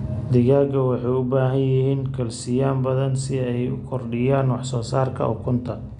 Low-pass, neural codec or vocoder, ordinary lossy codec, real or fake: 9.9 kHz; none; none; real